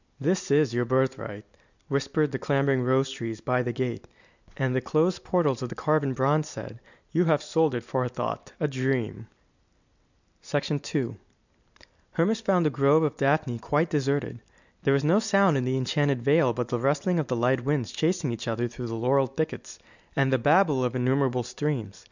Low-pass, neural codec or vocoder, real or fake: 7.2 kHz; none; real